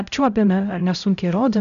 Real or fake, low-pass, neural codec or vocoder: fake; 7.2 kHz; codec, 16 kHz, 0.5 kbps, X-Codec, HuBERT features, trained on LibriSpeech